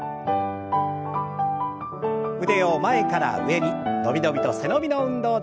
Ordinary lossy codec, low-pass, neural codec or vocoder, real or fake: none; none; none; real